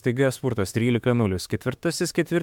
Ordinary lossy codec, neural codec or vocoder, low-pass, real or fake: MP3, 96 kbps; autoencoder, 48 kHz, 32 numbers a frame, DAC-VAE, trained on Japanese speech; 19.8 kHz; fake